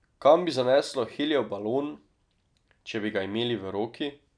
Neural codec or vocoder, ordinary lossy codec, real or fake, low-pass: none; none; real; 9.9 kHz